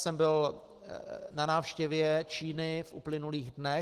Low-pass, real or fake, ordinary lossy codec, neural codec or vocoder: 14.4 kHz; real; Opus, 16 kbps; none